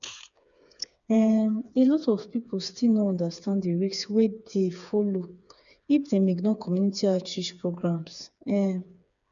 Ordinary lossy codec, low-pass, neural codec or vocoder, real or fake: none; 7.2 kHz; codec, 16 kHz, 4 kbps, FreqCodec, smaller model; fake